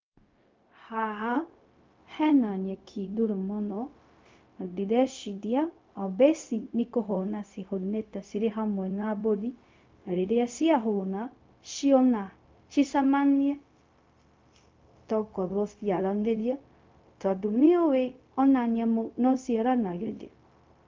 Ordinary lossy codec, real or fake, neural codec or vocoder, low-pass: Opus, 24 kbps; fake; codec, 16 kHz, 0.4 kbps, LongCat-Audio-Codec; 7.2 kHz